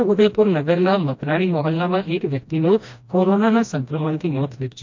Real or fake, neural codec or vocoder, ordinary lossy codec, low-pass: fake; codec, 16 kHz, 1 kbps, FreqCodec, smaller model; MP3, 48 kbps; 7.2 kHz